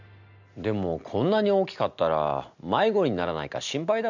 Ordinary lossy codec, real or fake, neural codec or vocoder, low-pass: none; real; none; 7.2 kHz